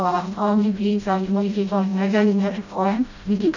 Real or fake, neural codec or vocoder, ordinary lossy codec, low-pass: fake; codec, 16 kHz, 0.5 kbps, FreqCodec, smaller model; none; 7.2 kHz